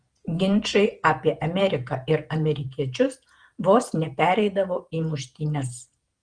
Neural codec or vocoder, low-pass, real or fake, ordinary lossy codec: vocoder, 48 kHz, 128 mel bands, Vocos; 9.9 kHz; fake; Opus, 24 kbps